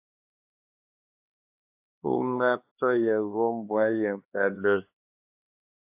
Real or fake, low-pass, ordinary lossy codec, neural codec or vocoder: fake; 3.6 kHz; AAC, 32 kbps; codec, 16 kHz, 2 kbps, X-Codec, HuBERT features, trained on LibriSpeech